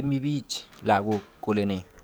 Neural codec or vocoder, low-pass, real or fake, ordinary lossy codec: codec, 44.1 kHz, 7.8 kbps, DAC; none; fake; none